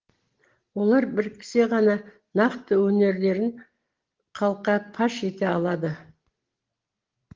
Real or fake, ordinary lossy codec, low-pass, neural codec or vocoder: real; Opus, 16 kbps; 7.2 kHz; none